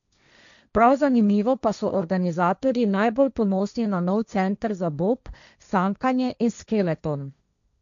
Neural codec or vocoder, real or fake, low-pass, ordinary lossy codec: codec, 16 kHz, 1.1 kbps, Voila-Tokenizer; fake; 7.2 kHz; none